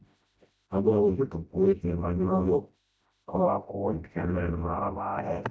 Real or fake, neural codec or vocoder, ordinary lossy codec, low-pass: fake; codec, 16 kHz, 0.5 kbps, FreqCodec, smaller model; none; none